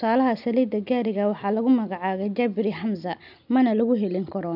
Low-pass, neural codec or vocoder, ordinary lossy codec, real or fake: 5.4 kHz; none; none; real